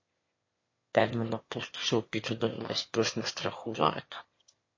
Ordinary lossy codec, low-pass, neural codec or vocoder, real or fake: MP3, 32 kbps; 7.2 kHz; autoencoder, 22.05 kHz, a latent of 192 numbers a frame, VITS, trained on one speaker; fake